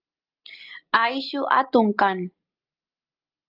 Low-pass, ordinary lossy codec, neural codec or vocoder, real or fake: 5.4 kHz; Opus, 24 kbps; none; real